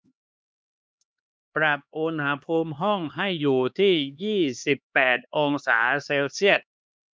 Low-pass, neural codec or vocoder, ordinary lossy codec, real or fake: none; codec, 16 kHz, 2 kbps, X-Codec, HuBERT features, trained on LibriSpeech; none; fake